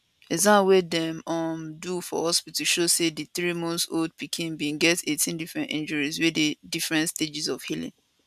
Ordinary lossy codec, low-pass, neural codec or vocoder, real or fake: none; 14.4 kHz; none; real